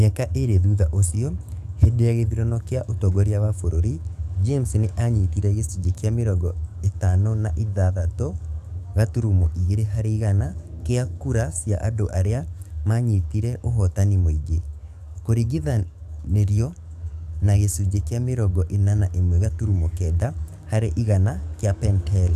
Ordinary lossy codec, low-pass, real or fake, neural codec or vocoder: none; 14.4 kHz; fake; codec, 44.1 kHz, 7.8 kbps, DAC